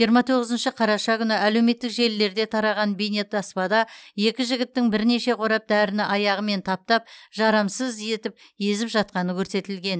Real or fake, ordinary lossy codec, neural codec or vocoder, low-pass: real; none; none; none